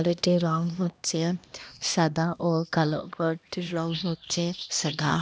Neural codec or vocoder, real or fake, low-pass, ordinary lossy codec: codec, 16 kHz, 1 kbps, X-Codec, HuBERT features, trained on LibriSpeech; fake; none; none